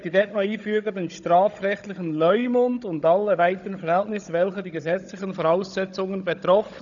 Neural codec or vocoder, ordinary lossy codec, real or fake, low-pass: codec, 16 kHz, 16 kbps, FunCodec, trained on Chinese and English, 50 frames a second; none; fake; 7.2 kHz